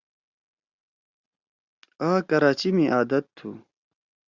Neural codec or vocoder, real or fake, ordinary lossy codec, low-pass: none; real; Opus, 64 kbps; 7.2 kHz